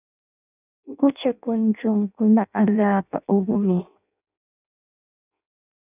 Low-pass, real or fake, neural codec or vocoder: 3.6 kHz; fake; codec, 16 kHz in and 24 kHz out, 0.6 kbps, FireRedTTS-2 codec